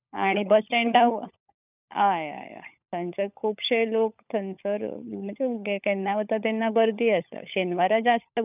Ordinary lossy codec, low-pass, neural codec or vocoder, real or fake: none; 3.6 kHz; codec, 16 kHz, 16 kbps, FunCodec, trained on LibriTTS, 50 frames a second; fake